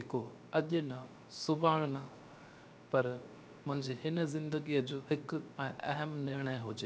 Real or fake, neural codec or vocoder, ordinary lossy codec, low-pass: fake; codec, 16 kHz, 0.7 kbps, FocalCodec; none; none